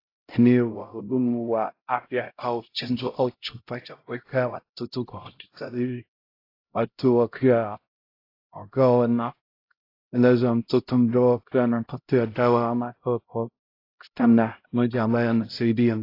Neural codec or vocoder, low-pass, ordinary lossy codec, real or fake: codec, 16 kHz, 0.5 kbps, X-Codec, HuBERT features, trained on LibriSpeech; 5.4 kHz; AAC, 32 kbps; fake